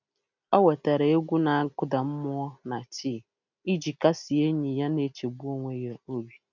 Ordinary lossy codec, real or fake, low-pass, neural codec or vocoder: none; real; 7.2 kHz; none